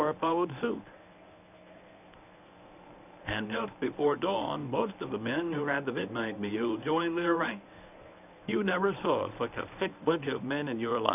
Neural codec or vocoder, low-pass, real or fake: codec, 24 kHz, 0.9 kbps, WavTokenizer, medium speech release version 1; 3.6 kHz; fake